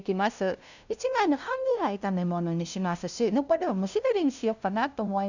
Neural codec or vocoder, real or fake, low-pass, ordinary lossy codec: codec, 16 kHz, 0.5 kbps, FunCodec, trained on LibriTTS, 25 frames a second; fake; 7.2 kHz; none